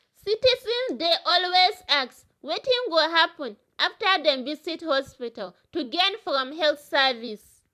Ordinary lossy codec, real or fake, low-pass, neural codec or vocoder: AAC, 96 kbps; fake; 14.4 kHz; vocoder, 44.1 kHz, 128 mel bands every 256 samples, BigVGAN v2